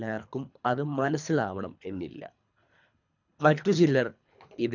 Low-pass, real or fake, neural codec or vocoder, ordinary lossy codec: 7.2 kHz; fake; codec, 24 kHz, 3 kbps, HILCodec; none